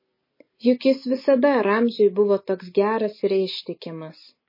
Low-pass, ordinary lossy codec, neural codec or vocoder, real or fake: 5.4 kHz; MP3, 24 kbps; none; real